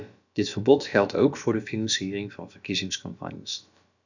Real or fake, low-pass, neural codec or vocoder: fake; 7.2 kHz; codec, 16 kHz, about 1 kbps, DyCAST, with the encoder's durations